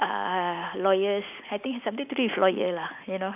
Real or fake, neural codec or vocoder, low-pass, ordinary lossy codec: real; none; 3.6 kHz; none